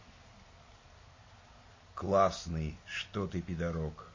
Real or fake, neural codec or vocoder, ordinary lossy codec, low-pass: real; none; MP3, 32 kbps; 7.2 kHz